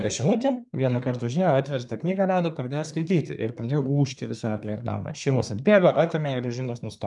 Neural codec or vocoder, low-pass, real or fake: codec, 24 kHz, 1 kbps, SNAC; 10.8 kHz; fake